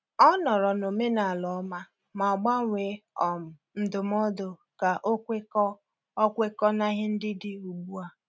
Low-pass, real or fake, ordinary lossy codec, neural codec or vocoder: none; real; none; none